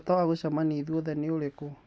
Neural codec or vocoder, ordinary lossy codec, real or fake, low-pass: none; none; real; none